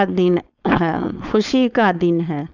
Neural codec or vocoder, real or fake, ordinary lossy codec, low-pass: codec, 16 kHz, 4.8 kbps, FACodec; fake; none; 7.2 kHz